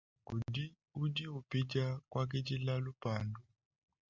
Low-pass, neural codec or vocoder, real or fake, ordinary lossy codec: 7.2 kHz; none; real; Opus, 64 kbps